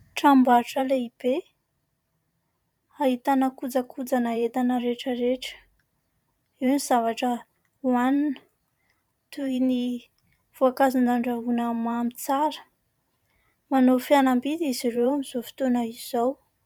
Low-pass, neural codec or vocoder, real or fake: 19.8 kHz; vocoder, 44.1 kHz, 128 mel bands every 512 samples, BigVGAN v2; fake